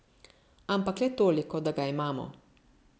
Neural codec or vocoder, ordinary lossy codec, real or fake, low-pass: none; none; real; none